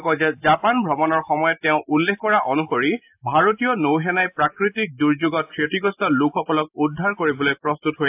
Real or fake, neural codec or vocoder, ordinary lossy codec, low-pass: real; none; AAC, 32 kbps; 3.6 kHz